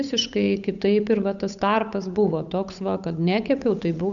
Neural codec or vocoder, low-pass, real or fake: codec, 16 kHz, 8 kbps, FunCodec, trained on Chinese and English, 25 frames a second; 7.2 kHz; fake